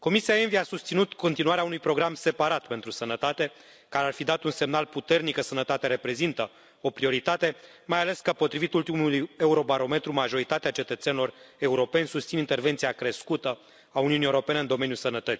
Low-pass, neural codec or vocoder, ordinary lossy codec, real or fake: none; none; none; real